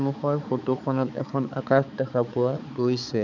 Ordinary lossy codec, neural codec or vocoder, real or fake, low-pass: none; codec, 16 kHz, 4 kbps, X-Codec, HuBERT features, trained on balanced general audio; fake; 7.2 kHz